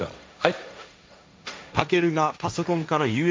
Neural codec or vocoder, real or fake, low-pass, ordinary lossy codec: codec, 16 kHz, 1.1 kbps, Voila-Tokenizer; fake; none; none